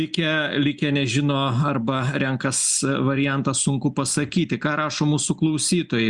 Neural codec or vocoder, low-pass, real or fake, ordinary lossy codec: none; 10.8 kHz; real; Opus, 64 kbps